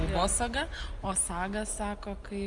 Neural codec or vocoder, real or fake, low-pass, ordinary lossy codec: none; real; 10.8 kHz; Opus, 32 kbps